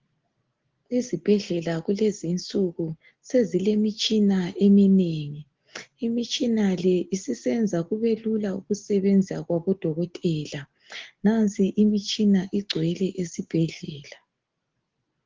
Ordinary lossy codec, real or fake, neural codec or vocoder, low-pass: Opus, 16 kbps; real; none; 7.2 kHz